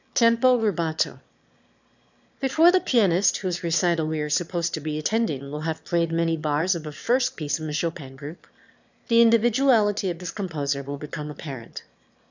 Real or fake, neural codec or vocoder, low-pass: fake; autoencoder, 22.05 kHz, a latent of 192 numbers a frame, VITS, trained on one speaker; 7.2 kHz